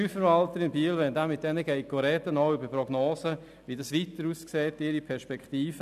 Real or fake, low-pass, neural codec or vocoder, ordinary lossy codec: real; 14.4 kHz; none; none